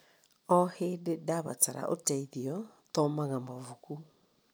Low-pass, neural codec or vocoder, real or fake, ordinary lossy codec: none; none; real; none